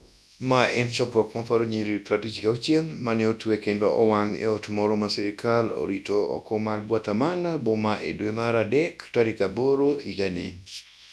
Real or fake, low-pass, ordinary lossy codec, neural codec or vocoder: fake; none; none; codec, 24 kHz, 0.9 kbps, WavTokenizer, large speech release